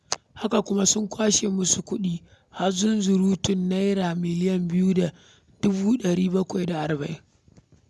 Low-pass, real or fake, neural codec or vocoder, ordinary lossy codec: none; real; none; none